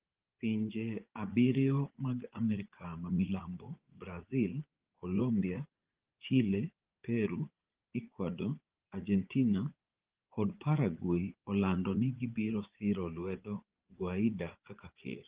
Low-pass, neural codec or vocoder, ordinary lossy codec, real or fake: 3.6 kHz; vocoder, 44.1 kHz, 80 mel bands, Vocos; Opus, 16 kbps; fake